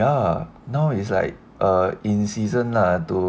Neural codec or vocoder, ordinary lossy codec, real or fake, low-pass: none; none; real; none